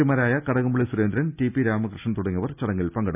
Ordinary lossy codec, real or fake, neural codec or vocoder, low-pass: none; real; none; 3.6 kHz